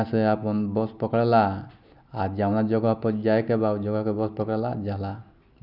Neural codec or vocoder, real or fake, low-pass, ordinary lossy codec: none; real; 5.4 kHz; none